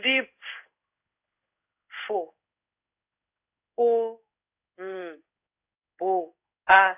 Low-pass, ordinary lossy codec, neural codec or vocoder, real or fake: 3.6 kHz; AAC, 32 kbps; codec, 16 kHz in and 24 kHz out, 1 kbps, XY-Tokenizer; fake